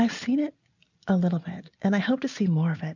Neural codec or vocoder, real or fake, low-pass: none; real; 7.2 kHz